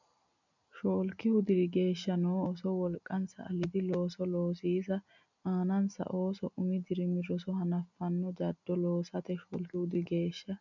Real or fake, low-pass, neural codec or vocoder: real; 7.2 kHz; none